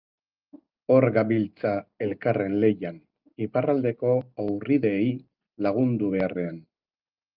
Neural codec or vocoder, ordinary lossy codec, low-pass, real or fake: none; Opus, 24 kbps; 5.4 kHz; real